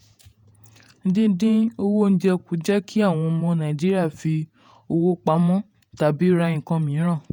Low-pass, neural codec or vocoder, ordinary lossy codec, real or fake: 19.8 kHz; vocoder, 48 kHz, 128 mel bands, Vocos; none; fake